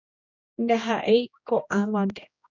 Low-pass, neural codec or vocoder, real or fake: 7.2 kHz; codec, 16 kHz, 1 kbps, X-Codec, HuBERT features, trained on general audio; fake